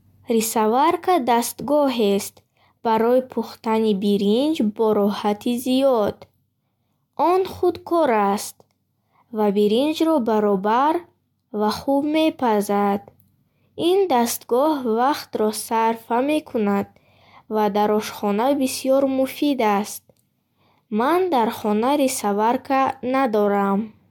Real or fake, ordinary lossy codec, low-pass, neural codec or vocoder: real; MP3, 96 kbps; 19.8 kHz; none